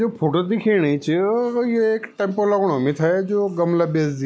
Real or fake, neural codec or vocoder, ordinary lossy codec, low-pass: real; none; none; none